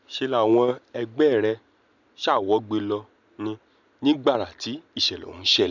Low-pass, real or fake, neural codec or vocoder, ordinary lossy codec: 7.2 kHz; real; none; none